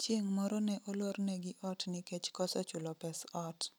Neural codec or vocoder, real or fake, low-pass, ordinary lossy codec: none; real; none; none